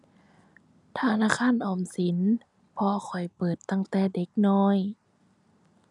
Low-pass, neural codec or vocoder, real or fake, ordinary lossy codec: 10.8 kHz; none; real; none